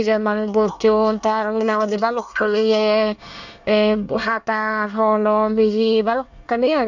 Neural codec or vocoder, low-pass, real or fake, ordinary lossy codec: codec, 24 kHz, 1 kbps, SNAC; 7.2 kHz; fake; none